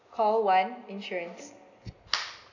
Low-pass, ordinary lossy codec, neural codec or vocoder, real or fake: 7.2 kHz; none; none; real